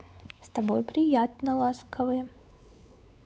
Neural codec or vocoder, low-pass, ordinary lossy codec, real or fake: codec, 16 kHz, 4 kbps, X-Codec, WavLM features, trained on Multilingual LibriSpeech; none; none; fake